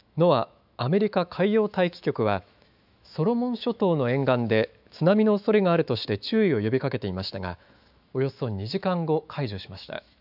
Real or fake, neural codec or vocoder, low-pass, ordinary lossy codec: fake; autoencoder, 48 kHz, 128 numbers a frame, DAC-VAE, trained on Japanese speech; 5.4 kHz; none